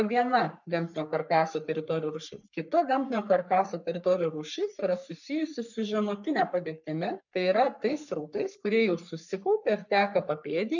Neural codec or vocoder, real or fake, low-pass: codec, 44.1 kHz, 3.4 kbps, Pupu-Codec; fake; 7.2 kHz